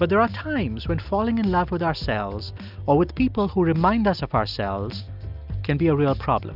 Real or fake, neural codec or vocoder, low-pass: real; none; 5.4 kHz